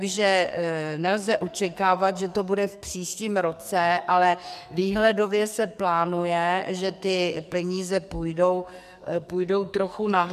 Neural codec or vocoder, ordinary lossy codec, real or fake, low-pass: codec, 44.1 kHz, 2.6 kbps, SNAC; MP3, 96 kbps; fake; 14.4 kHz